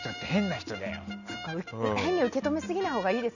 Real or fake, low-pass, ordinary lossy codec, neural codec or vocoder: real; 7.2 kHz; none; none